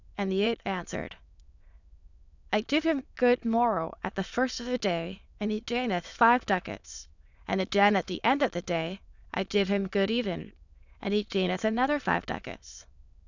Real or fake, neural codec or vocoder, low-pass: fake; autoencoder, 22.05 kHz, a latent of 192 numbers a frame, VITS, trained on many speakers; 7.2 kHz